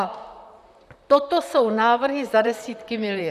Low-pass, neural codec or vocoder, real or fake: 14.4 kHz; vocoder, 44.1 kHz, 128 mel bands, Pupu-Vocoder; fake